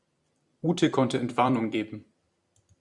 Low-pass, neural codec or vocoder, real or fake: 10.8 kHz; vocoder, 44.1 kHz, 128 mel bands every 256 samples, BigVGAN v2; fake